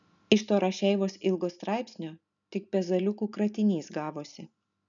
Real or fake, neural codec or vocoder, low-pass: real; none; 7.2 kHz